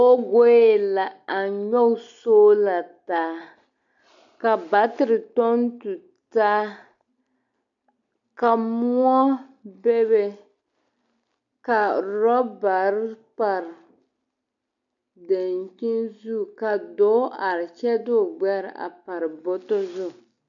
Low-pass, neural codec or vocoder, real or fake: 7.2 kHz; none; real